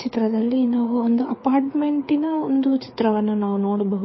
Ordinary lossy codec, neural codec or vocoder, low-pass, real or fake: MP3, 24 kbps; codec, 16 kHz, 4 kbps, FreqCodec, larger model; 7.2 kHz; fake